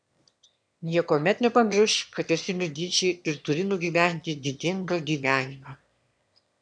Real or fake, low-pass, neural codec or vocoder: fake; 9.9 kHz; autoencoder, 22.05 kHz, a latent of 192 numbers a frame, VITS, trained on one speaker